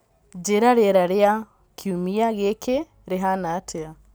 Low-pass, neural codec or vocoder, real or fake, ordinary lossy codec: none; none; real; none